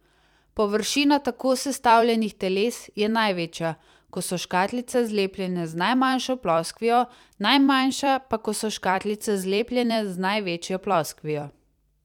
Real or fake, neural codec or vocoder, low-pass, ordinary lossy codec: fake; vocoder, 44.1 kHz, 128 mel bands every 256 samples, BigVGAN v2; 19.8 kHz; none